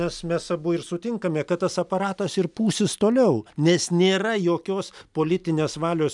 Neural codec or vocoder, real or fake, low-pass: none; real; 10.8 kHz